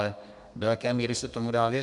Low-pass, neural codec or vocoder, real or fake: 10.8 kHz; codec, 32 kHz, 1.9 kbps, SNAC; fake